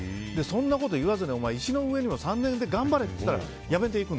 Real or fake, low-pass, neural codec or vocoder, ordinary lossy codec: real; none; none; none